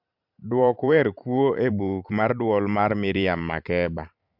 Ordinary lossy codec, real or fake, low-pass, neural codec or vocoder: MP3, 48 kbps; fake; 5.4 kHz; vocoder, 44.1 kHz, 128 mel bands every 512 samples, BigVGAN v2